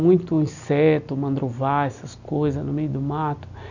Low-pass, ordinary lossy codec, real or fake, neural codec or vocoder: 7.2 kHz; none; real; none